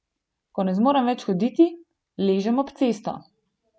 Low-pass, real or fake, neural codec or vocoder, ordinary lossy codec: none; real; none; none